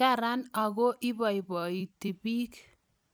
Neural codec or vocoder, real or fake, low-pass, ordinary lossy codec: vocoder, 44.1 kHz, 128 mel bands every 256 samples, BigVGAN v2; fake; none; none